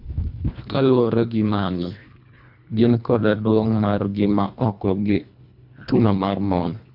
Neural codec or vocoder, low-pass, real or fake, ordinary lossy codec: codec, 24 kHz, 1.5 kbps, HILCodec; 5.4 kHz; fake; none